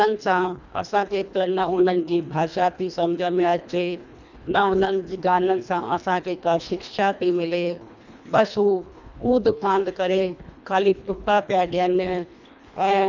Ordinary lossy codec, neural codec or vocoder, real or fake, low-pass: none; codec, 24 kHz, 1.5 kbps, HILCodec; fake; 7.2 kHz